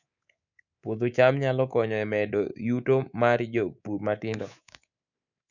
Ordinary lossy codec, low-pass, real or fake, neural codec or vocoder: none; 7.2 kHz; fake; codec, 24 kHz, 3.1 kbps, DualCodec